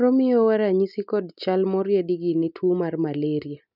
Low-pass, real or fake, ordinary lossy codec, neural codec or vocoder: 5.4 kHz; real; none; none